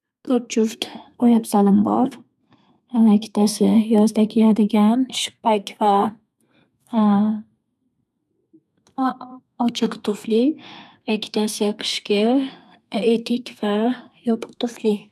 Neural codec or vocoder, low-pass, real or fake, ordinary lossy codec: codec, 32 kHz, 1.9 kbps, SNAC; 14.4 kHz; fake; none